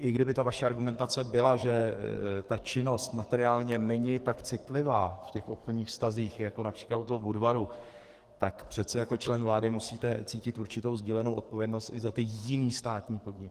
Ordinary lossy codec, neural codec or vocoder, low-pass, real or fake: Opus, 24 kbps; codec, 44.1 kHz, 2.6 kbps, SNAC; 14.4 kHz; fake